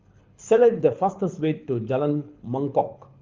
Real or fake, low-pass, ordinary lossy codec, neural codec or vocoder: fake; 7.2 kHz; Opus, 32 kbps; codec, 24 kHz, 6 kbps, HILCodec